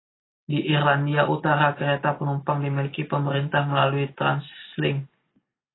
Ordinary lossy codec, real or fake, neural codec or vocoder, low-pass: AAC, 16 kbps; real; none; 7.2 kHz